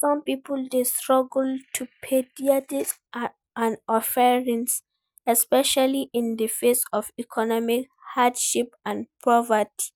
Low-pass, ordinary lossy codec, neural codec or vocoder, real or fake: none; none; none; real